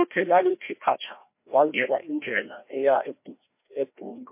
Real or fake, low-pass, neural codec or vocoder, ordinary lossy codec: fake; 3.6 kHz; codec, 16 kHz, 1 kbps, FreqCodec, larger model; MP3, 24 kbps